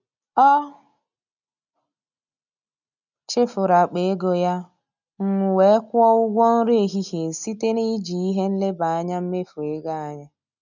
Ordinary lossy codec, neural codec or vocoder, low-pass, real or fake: none; none; 7.2 kHz; real